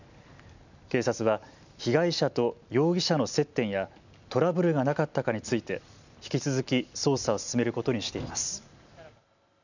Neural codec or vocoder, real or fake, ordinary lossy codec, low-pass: none; real; none; 7.2 kHz